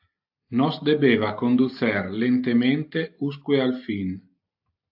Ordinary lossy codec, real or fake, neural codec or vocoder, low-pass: AAC, 48 kbps; real; none; 5.4 kHz